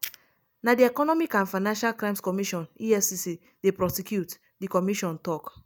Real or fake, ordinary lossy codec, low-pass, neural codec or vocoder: real; none; none; none